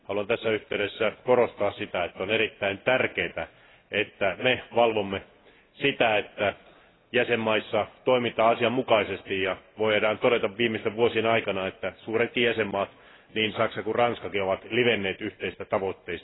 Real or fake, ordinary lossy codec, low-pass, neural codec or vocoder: real; AAC, 16 kbps; 7.2 kHz; none